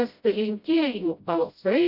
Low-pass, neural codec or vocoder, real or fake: 5.4 kHz; codec, 16 kHz, 0.5 kbps, FreqCodec, smaller model; fake